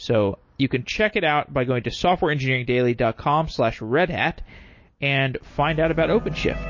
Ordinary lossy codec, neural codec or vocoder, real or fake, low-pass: MP3, 32 kbps; none; real; 7.2 kHz